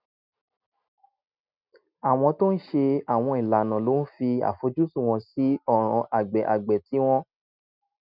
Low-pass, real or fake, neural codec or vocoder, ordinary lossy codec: 5.4 kHz; real; none; none